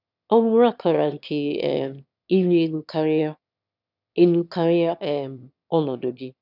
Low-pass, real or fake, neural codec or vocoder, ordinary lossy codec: 5.4 kHz; fake; autoencoder, 22.05 kHz, a latent of 192 numbers a frame, VITS, trained on one speaker; none